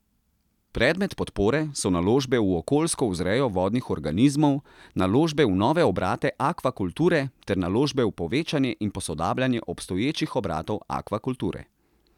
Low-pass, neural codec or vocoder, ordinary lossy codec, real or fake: 19.8 kHz; none; none; real